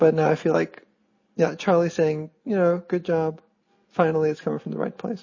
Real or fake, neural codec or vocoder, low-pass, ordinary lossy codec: real; none; 7.2 kHz; MP3, 32 kbps